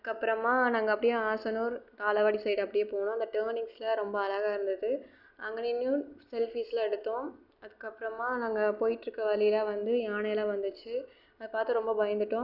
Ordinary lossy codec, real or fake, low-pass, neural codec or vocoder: none; real; 5.4 kHz; none